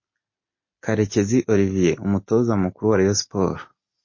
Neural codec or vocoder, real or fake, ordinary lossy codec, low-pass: none; real; MP3, 32 kbps; 7.2 kHz